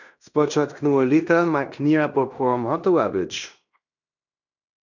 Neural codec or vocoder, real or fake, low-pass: codec, 16 kHz in and 24 kHz out, 0.9 kbps, LongCat-Audio-Codec, fine tuned four codebook decoder; fake; 7.2 kHz